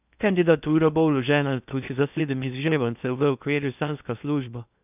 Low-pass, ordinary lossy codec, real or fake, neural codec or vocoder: 3.6 kHz; none; fake; codec, 16 kHz in and 24 kHz out, 0.6 kbps, FocalCodec, streaming, 4096 codes